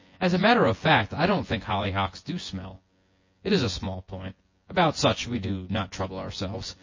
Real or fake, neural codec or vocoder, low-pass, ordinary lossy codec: fake; vocoder, 24 kHz, 100 mel bands, Vocos; 7.2 kHz; MP3, 32 kbps